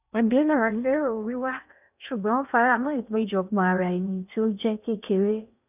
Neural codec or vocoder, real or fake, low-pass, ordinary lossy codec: codec, 16 kHz in and 24 kHz out, 0.6 kbps, FocalCodec, streaming, 2048 codes; fake; 3.6 kHz; none